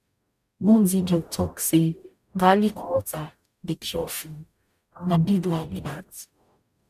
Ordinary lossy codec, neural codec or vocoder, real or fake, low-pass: none; codec, 44.1 kHz, 0.9 kbps, DAC; fake; 14.4 kHz